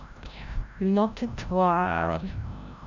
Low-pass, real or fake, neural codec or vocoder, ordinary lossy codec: 7.2 kHz; fake; codec, 16 kHz, 0.5 kbps, FreqCodec, larger model; none